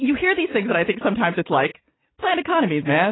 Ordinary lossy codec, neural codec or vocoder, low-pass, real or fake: AAC, 16 kbps; none; 7.2 kHz; real